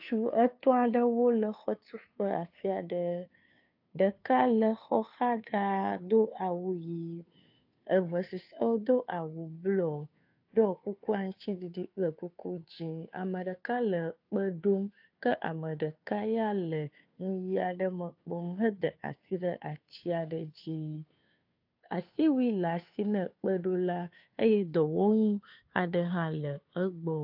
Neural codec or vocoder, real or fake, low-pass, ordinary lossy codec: codec, 16 kHz, 2 kbps, FunCodec, trained on Chinese and English, 25 frames a second; fake; 5.4 kHz; AAC, 48 kbps